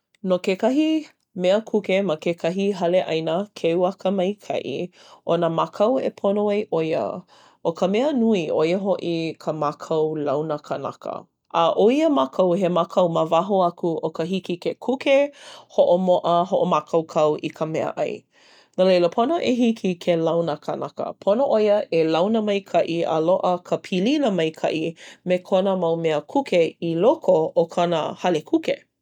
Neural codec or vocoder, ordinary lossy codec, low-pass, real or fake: none; none; 19.8 kHz; real